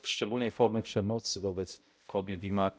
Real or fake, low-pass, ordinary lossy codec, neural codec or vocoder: fake; none; none; codec, 16 kHz, 0.5 kbps, X-Codec, HuBERT features, trained on balanced general audio